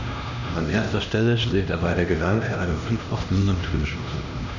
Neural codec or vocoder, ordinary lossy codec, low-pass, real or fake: codec, 16 kHz, 1 kbps, X-Codec, HuBERT features, trained on LibriSpeech; none; 7.2 kHz; fake